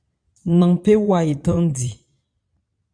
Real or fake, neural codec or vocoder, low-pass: fake; vocoder, 22.05 kHz, 80 mel bands, Vocos; 9.9 kHz